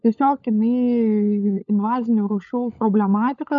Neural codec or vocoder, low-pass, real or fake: codec, 16 kHz, 16 kbps, FunCodec, trained on LibriTTS, 50 frames a second; 7.2 kHz; fake